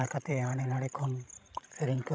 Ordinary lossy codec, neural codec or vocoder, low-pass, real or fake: none; codec, 16 kHz, 16 kbps, FreqCodec, larger model; none; fake